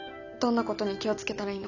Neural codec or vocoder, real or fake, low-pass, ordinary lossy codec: none; real; 7.2 kHz; none